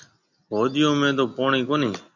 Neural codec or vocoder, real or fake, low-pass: none; real; 7.2 kHz